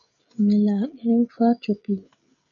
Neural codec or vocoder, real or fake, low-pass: codec, 16 kHz, 16 kbps, FreqCodec, smaller model; fake; 7.2 kHz